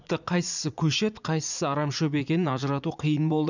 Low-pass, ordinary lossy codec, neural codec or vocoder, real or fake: 7.2 kHz; none; vocoder, 44.1 kHz, 80 mel bands, Vocos; fake